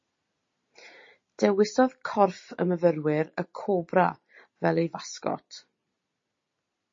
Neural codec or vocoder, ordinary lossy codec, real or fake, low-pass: none; MP3, 32 kbps; real; 7.2 kHz